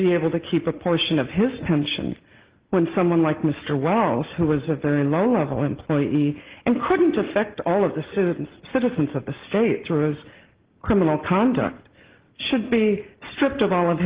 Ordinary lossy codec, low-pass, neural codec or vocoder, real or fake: Opus, 16 kbps; 3.6 kHz; none; real